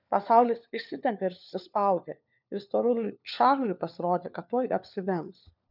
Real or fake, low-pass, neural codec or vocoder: fake; 5.4 kHz; codec, 16 kHz, 4 kbps, FunCodec, trained on LibriTTS, 50 frames a second